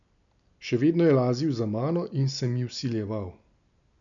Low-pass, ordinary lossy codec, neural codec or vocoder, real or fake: 7.2 kHz; none; none; real